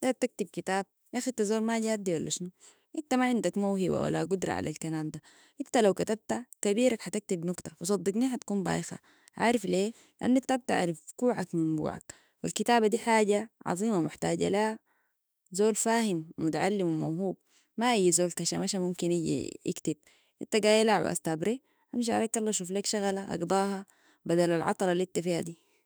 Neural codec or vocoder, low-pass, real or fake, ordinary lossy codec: autoencoder, 48 kHz, 32 numbers a frame, DAC-VAE, trained on Japanese speech; none; fake; none